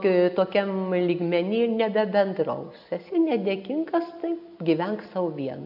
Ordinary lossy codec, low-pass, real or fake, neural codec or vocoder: AAC, 48 kbps; 5.4 kHz; real; none